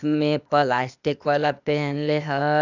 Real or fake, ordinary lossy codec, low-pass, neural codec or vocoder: fake; none; 7.2 kHz; codec, 16 kHz, 0.8 kbps, ZipCodec